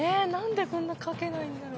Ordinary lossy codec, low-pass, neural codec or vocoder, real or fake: none; none; none; real